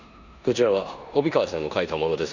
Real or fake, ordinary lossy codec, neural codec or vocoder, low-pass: fake; none; codec, 16 kHz in and 24 kHz out, 0.9 kbps, LongCat-Audio-Codec, four codebook decoder; 7.2 kHz